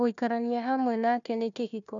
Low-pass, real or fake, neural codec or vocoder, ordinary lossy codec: 7.2 kHz; fake; codec, 16 kHz, 1 kbps, FunCodec, trained on Chinese and English, 50 frames a second; none